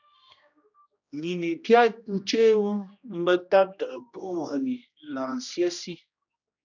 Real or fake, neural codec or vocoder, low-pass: fake; codec, 16 kHz, 1 kbps, X-Codec, HuBERT features, trained on general audio; 7.2 kHz